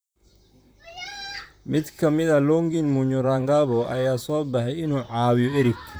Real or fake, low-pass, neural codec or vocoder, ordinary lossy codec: fake; none; vocoder, 44.1 kHz, 128 mel bands, Pupu-Vocoder; none